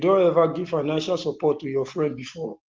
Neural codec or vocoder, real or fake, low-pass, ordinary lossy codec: none; real; 7.2 kHz; Opus, 16 kbps